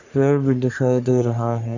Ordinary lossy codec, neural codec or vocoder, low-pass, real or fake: none; codec, 44.1 kHz, 3.4 kbps, Pupu-Codec; 7.2 kHz; fake